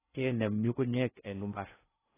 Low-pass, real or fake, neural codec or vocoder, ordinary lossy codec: 3.6 kHz; fake; codec, 16 kHz in and 24 kHz out, 0.6 kbps, FocalCodec, streaming, 4096 codes; AAC, 16 kbps